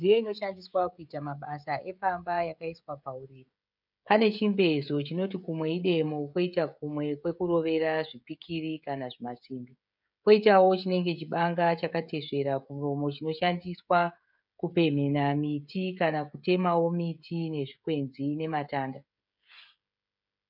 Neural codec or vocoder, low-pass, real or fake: codec, 16 kHz, 16 kbps, FreqCodec, smaller model; 5.4 kHz; fake